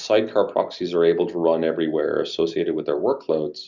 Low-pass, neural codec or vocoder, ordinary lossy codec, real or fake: 7.2 kHz; none; Opus, 64 kbps; real